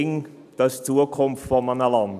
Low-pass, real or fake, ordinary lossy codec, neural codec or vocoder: 14.4 kHz; real; none; none